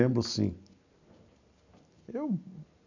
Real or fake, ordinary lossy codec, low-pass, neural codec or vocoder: real; none; 7.2 kHz; none